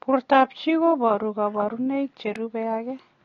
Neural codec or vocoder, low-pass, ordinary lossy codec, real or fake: none; 7.2 kHz; AAC, 32 kbps; real